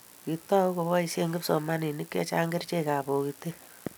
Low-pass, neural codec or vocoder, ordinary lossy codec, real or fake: none; none; none; real